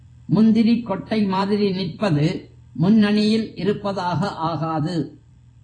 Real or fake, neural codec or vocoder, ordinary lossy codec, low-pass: fake; vocoder, 44.1 kHz, 128 mel bands every 256 samples, BigVGAN v2; AAC, 32 kbps; 9.9 kHz